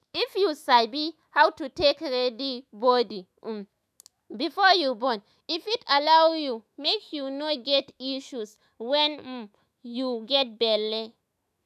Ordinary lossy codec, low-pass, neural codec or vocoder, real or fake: none; 14.4 kHz; autoencoder, 48 kHz, 128 numbers a frame, DAC-VAE, trained on Japanese speech; fake